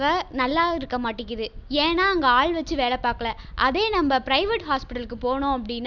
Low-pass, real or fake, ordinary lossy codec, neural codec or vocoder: 7.2 kHz; real; none; none